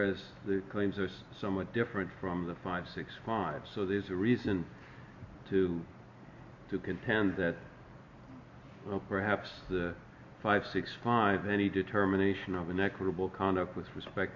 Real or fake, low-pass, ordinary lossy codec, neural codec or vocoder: real; 7.2 kHz; MP3, 64 kbps; none